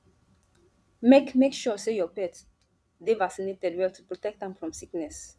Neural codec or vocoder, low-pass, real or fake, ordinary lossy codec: vocoder, 22.05 kHz, 80 mel bands, Vocos; none; fake; none